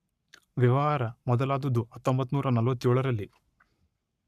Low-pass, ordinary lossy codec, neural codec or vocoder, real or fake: 14.4 kHz; none; codec, 44.1 kHz, 7.8 kbps, Pupu-Codec; fake